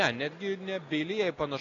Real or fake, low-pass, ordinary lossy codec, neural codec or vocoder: real; 7.2 kHz; AAC, 32 kbps; none